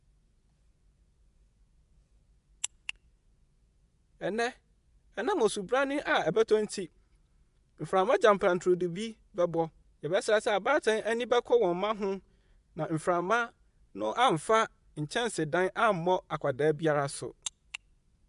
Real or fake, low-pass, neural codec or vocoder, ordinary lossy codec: fake; 10.8 kHz; vocoder, 24 kHz, 100 mel bands, Vocos; MP3, 96 kbps